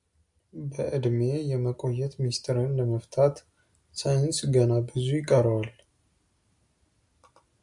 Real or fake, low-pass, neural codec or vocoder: real; 10.8 kHz; none